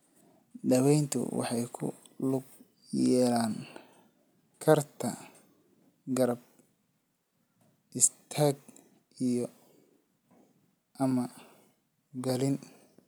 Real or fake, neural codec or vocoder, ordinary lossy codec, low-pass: real; none; none; none